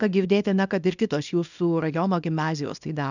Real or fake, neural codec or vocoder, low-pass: fake; codec, 24 kHz, 0.9 kbps, WavTokenizer, small release; 7.2 kHz